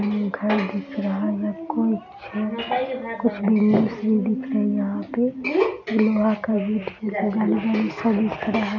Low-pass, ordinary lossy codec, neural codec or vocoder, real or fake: 7.2 kHz; none; none; real